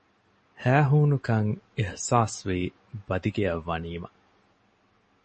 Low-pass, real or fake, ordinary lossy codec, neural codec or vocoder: 9.9 kHz; real; MP3, 32 kbps; none